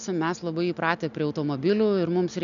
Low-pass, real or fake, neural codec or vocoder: 7.2 kHz; real; none